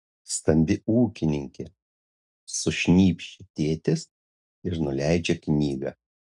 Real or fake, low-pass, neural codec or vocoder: real; 10.8 kHz; none